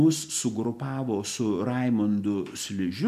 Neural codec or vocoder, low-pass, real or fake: none; 14.4 kHz; real